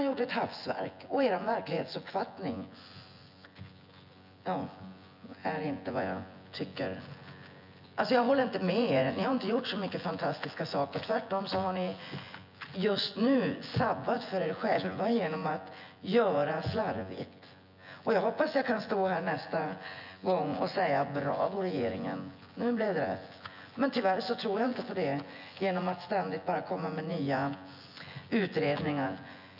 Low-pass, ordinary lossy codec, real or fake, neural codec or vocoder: 5.4 kHz; none; fake; vocoder, 24 kHz, 100 mel bands, Vocos